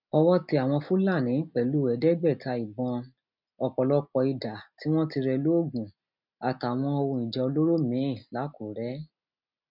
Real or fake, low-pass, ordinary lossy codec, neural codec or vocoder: real; 5.4 kHz; none; none